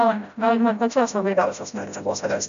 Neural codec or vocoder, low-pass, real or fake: codec, 16 kHz, 0.5 kbps, FreqCodec, smaller model; 7.2 kHz; fake